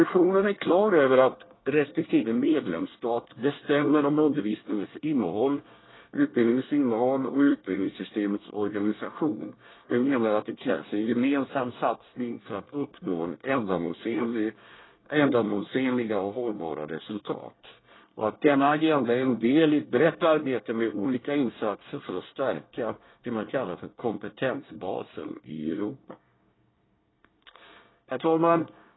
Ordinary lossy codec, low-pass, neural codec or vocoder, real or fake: AAC, 16 kbps; 7.2 kHz; codec, 24 kHz, 1 kbps, SNAC; fake